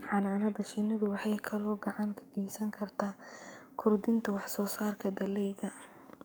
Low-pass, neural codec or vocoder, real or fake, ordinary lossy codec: none; codec, 44.1 kHz, 7.8 kbps, DAC; fake; none